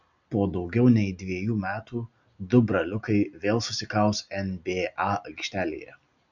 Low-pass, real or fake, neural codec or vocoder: 7.2 kHz; real; none